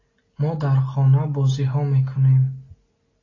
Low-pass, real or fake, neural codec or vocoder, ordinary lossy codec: 7.2 kHz; real; none; AAC, 32 kbps